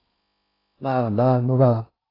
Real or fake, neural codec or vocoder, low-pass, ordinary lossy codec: fake; codec, 16 kHz in and 24 kHz out, 0.6 kbps, FocalCodec, streaming, 4096 codes; 5.4 kHz; AAC, 24 kbps